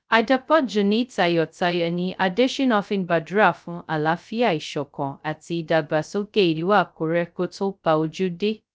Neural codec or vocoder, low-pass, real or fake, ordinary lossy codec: codec, 16 kHz, 0.2 kbps, FocalCodec; none; fake; none